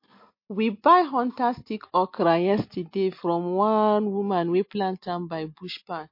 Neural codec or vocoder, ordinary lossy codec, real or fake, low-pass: none; MP3, 32 kbps; real; 5.4 kHz